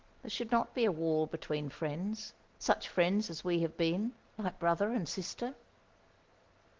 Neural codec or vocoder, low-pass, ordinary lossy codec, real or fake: none; 7.2 kHz; Opus, 16 kbps; real